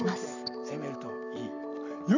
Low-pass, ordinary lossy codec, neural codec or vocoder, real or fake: 7.2 kHz; none; none; real